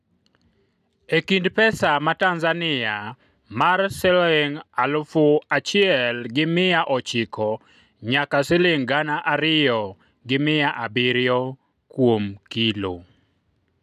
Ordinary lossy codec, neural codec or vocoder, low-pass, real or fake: none; none; 14.4 kHz; real